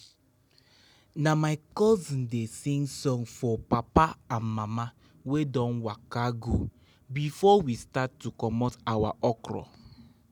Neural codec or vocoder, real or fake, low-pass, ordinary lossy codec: none; real; none; none